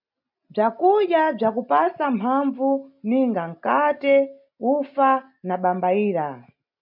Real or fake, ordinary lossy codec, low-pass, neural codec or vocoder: real; MP3, 48 kbps; 5.4 kHz; none